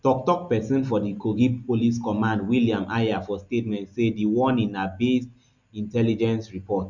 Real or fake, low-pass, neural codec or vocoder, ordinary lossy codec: real; 7.2 kHz; none; none